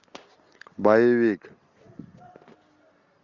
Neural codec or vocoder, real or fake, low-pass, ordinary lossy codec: none; real; 7.2 kHz; Opus, 64 kbps